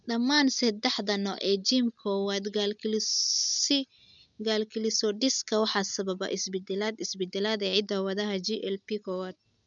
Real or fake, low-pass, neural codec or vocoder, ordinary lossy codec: real; 7.2 kHz; none; none